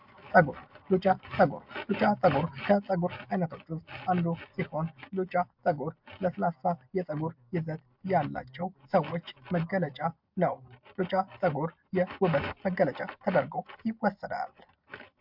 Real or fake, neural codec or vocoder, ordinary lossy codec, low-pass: real; none; MP3, 48 kbps; 5.4 kHz